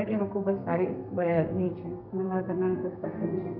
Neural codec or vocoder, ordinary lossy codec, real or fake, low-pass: codec, 32 kHz, 1.9 kbps, SNAC; none; fake; 5.4 kHz